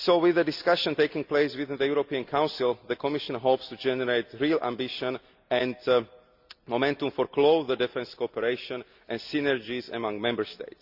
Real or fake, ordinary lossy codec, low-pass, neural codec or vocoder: real; Opus, 64 kbps; 5.4 kHz; none